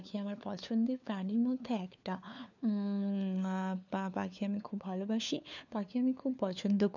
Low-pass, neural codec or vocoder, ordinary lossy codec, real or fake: 7.2 kHz; codec, 16 kHz, 4 kbps, FunCodec, trained on LibriTTS, 50 frames a second; none; fake